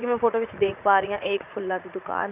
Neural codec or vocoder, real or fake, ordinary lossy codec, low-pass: vocoder, 44.1 kHz, 80 mel bands, Vocos; fake; none; 3.6 kHz